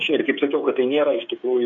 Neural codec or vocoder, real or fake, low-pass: codec, 16 kHz, 8 kbps, FreqCodec, smaller model; fake; 7.2 kHz